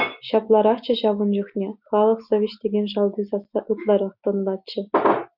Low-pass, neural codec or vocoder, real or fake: 5.4 kHz; none; real